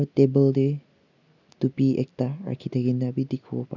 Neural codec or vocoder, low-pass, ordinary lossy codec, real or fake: none; 7.2 kHz; none; real